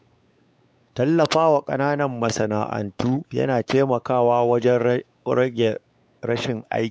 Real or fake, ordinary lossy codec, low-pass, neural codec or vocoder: fake; none; none; codec, 16 kHz, 4 kbps, X-Codec, WavLM features, trained on Multilingual LibriSpeech